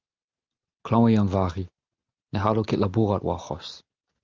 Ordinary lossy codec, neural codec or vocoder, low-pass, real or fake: Opus, 32 kbps; none; 7.2 kHz; real